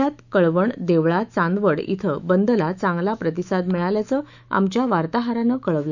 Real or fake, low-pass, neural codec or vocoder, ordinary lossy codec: fake; 7.2 kHz; autoencoder, 48 kHz, 128 numbers a frame, DAC-VAE, trained on Japanese speech; none